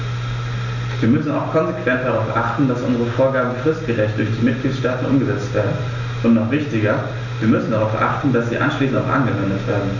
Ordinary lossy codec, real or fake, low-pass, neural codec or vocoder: none; real; 7.2 kHz; none